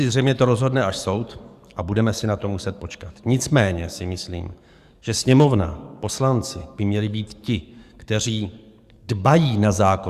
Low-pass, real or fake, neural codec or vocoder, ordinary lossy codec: 14.4 kHz; fake; codec, 44.1 kHz, 7.8 kbps, DAC; Opus, 64 kbps